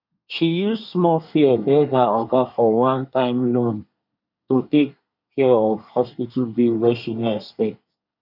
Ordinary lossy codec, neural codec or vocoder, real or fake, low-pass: none; codec, 24 kHz, 1 kbps, SNAC; fake; 5.4 kHz